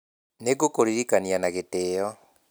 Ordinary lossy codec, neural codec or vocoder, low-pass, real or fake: none; none; none; real